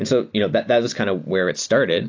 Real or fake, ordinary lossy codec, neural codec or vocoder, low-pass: real; AAC, 48 kbps; none; 7.2 kHz